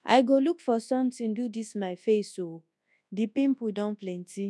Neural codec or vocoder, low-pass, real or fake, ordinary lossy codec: codec, 24 kHz, 0.9 kbps, WavTokenizer, large speech release; none; fake; none